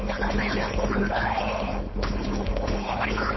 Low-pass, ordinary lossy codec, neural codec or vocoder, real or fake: 7.2 kHz; MP3, 24 kbps; codec, 16 kHz, 4.8 kbps, FACodec; fake